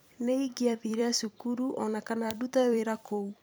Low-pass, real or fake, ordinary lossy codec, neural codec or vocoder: none; fake; none; vocoder, 44.1 kHz, 128 mel bands every 256 samples, BigVGAN v2